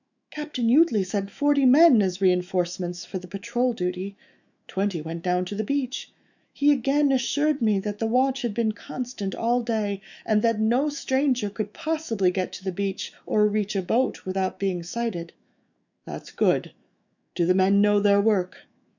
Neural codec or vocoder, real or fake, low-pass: autoencoder, 48 kHz, 128 numbers a frame, DAC-VAE, trained on Japanese speech; fake; 7.2 kHz